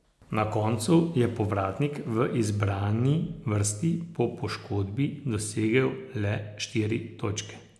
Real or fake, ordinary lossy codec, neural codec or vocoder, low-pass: real; none; none; none